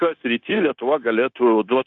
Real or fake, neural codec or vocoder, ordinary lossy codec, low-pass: fake; codec, 16 kHz, 0.9 kbps, LongCat-Audio-Codec; Opus, 64 kbps; 7.2 kHz